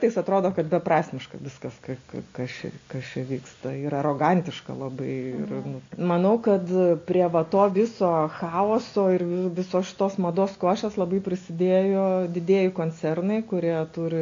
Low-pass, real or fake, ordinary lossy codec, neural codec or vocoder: 7.2 kHz; real; AAC, 48 kbps; none